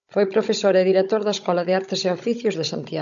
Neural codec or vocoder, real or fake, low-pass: codec, 16 kHz, 16 kbps, FunCodec, trained on Chinese and English, 50 frames a second; fake; 7.2 kHz